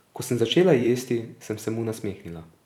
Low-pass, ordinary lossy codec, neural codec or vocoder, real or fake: 19.8 kHz; none; vocoder, 44.1 kHz, 128 mel bands every 256 samples, BigVGAN v2; fake